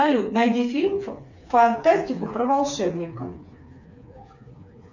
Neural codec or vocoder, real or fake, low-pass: codec, 16 kHz, 4 kbps, FreqCodec, smaller model; fake; 7.2 kHz